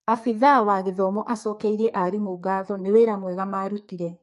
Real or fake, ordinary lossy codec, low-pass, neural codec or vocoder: fake; MP3, 48 kbps; 14.4 kHz; codec, 44.1 kHz, 2.6 kbps, SNAC